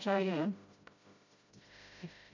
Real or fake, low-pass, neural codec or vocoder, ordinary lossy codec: fake; 7.2 kHz; codec, 16 kHz, 0.5 kbps, FreqCodec, smaller model; MP3, 64 kbps